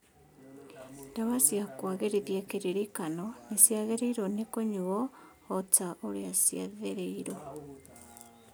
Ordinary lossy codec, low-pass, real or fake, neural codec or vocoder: none; none; real; none